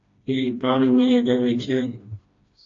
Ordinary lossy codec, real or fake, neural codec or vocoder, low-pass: AAC, 32 kbps; fake; codec, 16 kHz, 1 kbps, FreqCodec, smaller model; 7.2 kHz